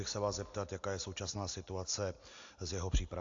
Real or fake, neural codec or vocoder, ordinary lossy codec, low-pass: real; none; AAC, 48 kbps; 7.2 kHz